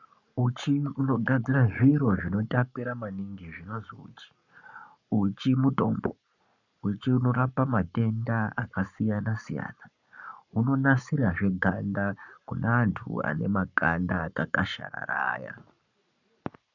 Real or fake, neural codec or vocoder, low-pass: fake; codec, 44.1 kHz, 7.8 kbps, Pupu-Codec; 7.2 kHz